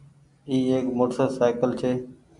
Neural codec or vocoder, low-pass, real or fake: none; 10.8 kHz; real